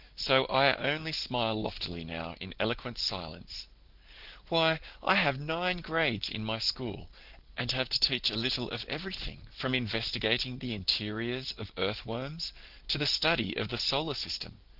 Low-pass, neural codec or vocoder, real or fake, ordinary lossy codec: 5.4 kHz; none; real; Opus, 16 kbps